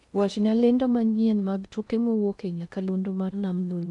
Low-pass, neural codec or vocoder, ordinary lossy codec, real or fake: 10.8 kHz; codec, 16 kHz in and 24 kHz out, 0.6 kbps, FocalCodec, streaming, 2048 codes; none; fake